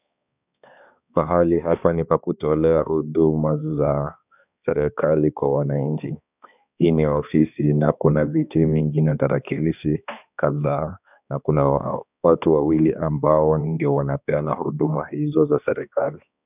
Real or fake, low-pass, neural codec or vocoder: fake; 3.6 kHz; codec, 16 kHz, 2 kbps, X-Codec, HuBERT features, trained on balanced general audio